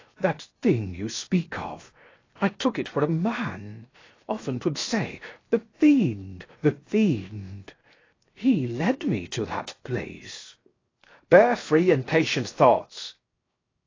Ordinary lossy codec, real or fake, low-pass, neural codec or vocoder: AAC, 32 kbps; fake; 7.2 kHz; codec, 16 kHz, 0.7 kbps, FocalCodec